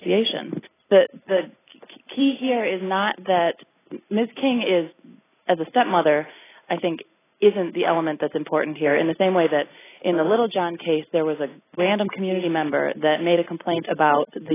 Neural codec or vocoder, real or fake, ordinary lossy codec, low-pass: none; real; AAC, 16 kbps; 3.6 kHz